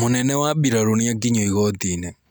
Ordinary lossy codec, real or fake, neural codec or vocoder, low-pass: none; real; none; none